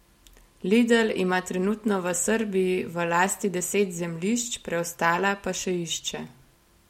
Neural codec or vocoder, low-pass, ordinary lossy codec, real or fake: none; 19.8 kHz; MP3, 64 kbps; real